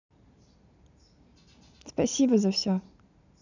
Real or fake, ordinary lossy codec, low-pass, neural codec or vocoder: real; none; 7.2 kHz; none